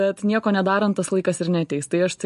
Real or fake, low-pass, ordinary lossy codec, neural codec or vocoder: real; 14.4 kHz; MP3, 48 kbps; none